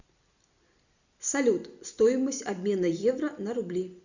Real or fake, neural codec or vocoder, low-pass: real; none; 7.2 kHz